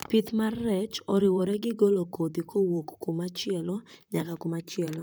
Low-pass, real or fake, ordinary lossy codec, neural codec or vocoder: none; fake; none; vocoder, 44.1 kHz, 128 mel bands, Pupu-Vocoder